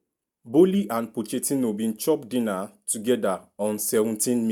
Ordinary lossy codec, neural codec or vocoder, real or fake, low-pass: none; none; real; none